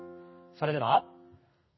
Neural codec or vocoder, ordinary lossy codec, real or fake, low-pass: codec, 32 kHz, 1.9 kbps, SNAC; MP3, 24 kbps; fake; 7.2 kHz